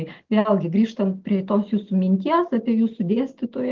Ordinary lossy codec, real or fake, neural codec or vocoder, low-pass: Opus, 16 kbps; real; none; 7.2 kHz